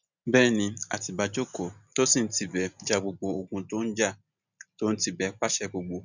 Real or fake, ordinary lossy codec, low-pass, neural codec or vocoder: real; none; 7.2 kHz; none